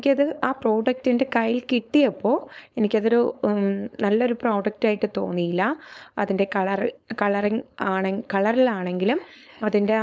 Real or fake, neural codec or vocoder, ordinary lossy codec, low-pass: fake; codec, 16 kHz, 4.8 kbps, FACodec; none; none